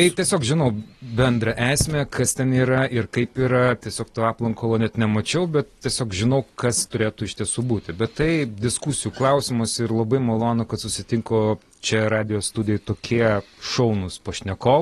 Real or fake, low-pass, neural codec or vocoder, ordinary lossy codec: real; 19.8 kHz; none; AAC, 32 kbps